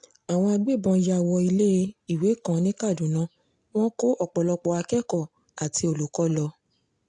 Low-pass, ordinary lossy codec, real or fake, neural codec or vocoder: 9.9 kHz; AAC, 64 kbps; real; none